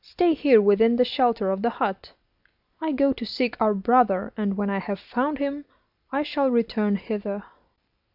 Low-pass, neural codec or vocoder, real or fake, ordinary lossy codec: 5.4 kHz; none; real; AAC, 48 kbps